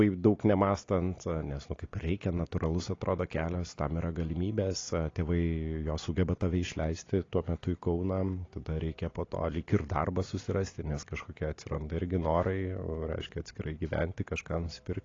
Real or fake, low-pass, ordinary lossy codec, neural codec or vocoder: real; 7.2 kHz; AAC, 32 kbps; none